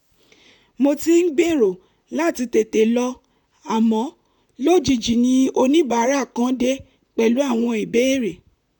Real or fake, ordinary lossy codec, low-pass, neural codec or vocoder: fake; none; 19.8 kHz; vocoder, 44.1 kHz, 128 mel bands, Pupu-Vocoder